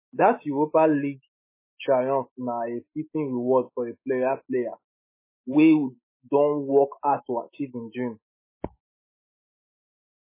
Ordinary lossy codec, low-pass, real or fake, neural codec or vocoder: MP3, 16 kbps; 3.6 kHz; fake; autoencoder, 48 kHz, 128 numbers a frame, DAC-VAE, trained on Japanese speech